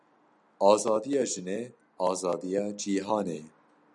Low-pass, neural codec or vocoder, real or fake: 10.8 kHz; none; real